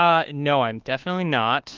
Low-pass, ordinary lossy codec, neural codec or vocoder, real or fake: 7.2 kHz; Opus, 16 kbps; codec, 24 kHz, 1.2 kbps, DualCodec; fake